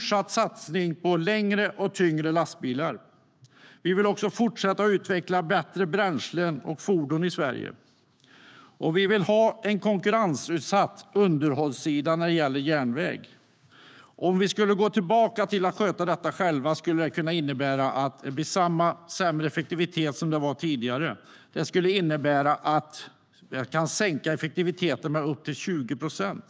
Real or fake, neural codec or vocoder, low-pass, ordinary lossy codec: fake; codec, 16 kHz, 6 kbps, DAC; none; none